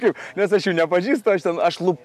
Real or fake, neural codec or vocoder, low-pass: real; none; 14.4 kHz